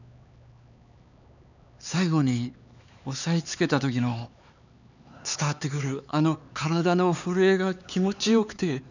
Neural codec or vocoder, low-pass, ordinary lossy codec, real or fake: codec, 16 kHz, 4 kbps, X-Codec, HuBERT features, trained on LibriSpeech; 7.2 kHz; none; fake